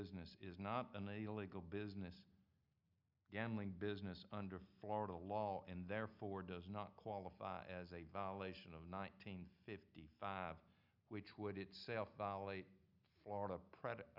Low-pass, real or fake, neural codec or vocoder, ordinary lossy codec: 5.4 kHz; real; none; Opus, 64 kbps